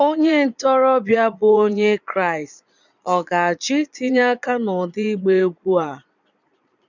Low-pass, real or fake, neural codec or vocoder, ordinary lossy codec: 7.2 kHz; fake; vocoder, 22.05 kHz, 80 mel bands, Vocos; none